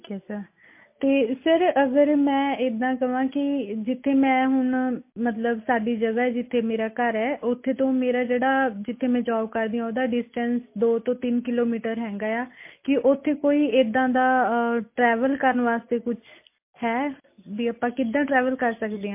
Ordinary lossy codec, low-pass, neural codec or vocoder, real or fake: MP3, 24 kbps; 3.6 kHz; none; real